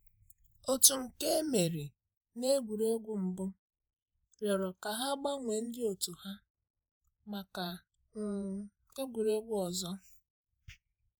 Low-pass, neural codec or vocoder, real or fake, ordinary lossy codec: none; vocoder, 48 kHz, 128 mel bands, Vocos; fake; none